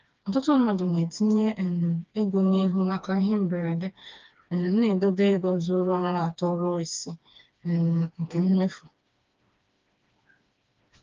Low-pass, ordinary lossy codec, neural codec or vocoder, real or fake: 7.2 kHz; Opus, 24 kbps; codec, 16 kHz, 2 kbps, FreqCodec, smaller model; fake